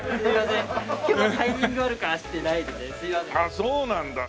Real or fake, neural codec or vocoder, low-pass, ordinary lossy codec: real; none; none; none